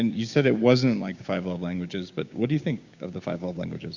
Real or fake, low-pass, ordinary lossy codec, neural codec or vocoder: fake; 7.2 kHz; Opus, 64 kbps; codec, 16 kHz, 6 kbps, DAC